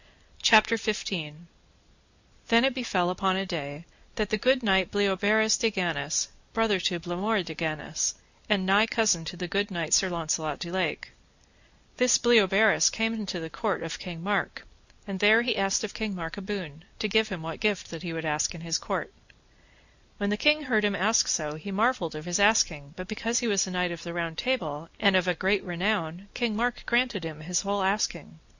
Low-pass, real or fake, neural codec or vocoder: 7.2 kHz; real; none